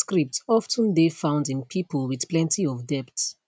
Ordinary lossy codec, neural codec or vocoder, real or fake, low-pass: none; none; real; none